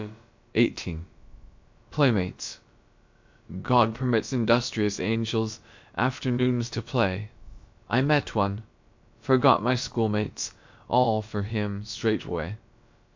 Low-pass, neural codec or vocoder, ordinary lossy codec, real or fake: 7.2 kHz; codec, 16 kHz, about 1 kbps, DyCAST, with the encoder's durations; MP3, 64 kbps; fake